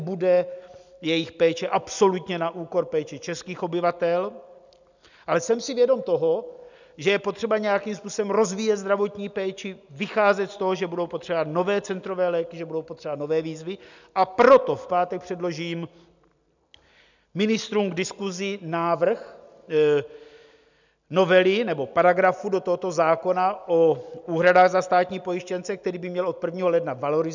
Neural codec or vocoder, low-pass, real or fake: none; 7.2 kHz; real